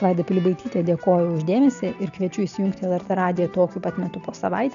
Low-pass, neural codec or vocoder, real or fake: 7.2 kHz; none; real